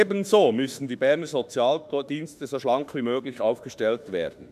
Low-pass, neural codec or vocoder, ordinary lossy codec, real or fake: 14.4 kHz; autoencoder, 48 kHz, 32 numbers a frame, DAC-VAE, trained on Japanese speech; none; fake